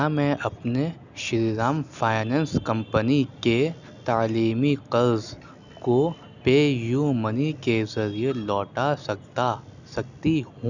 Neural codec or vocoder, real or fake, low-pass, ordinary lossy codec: none; real; 7.2 kHz; none